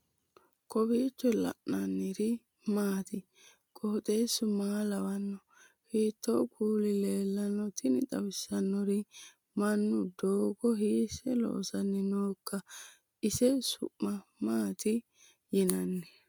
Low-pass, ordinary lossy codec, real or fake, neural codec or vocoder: 19.8 kHz; MP3, 96 kbps; real; none